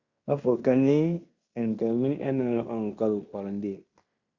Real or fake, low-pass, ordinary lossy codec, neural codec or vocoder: fake; 7.2 kHz; Opus, 64 kbps; codec, 16 kHz in and 24 kHz out, 0.9 kbps, LongCat-Audio-Codec, fine tuned four codebook decoder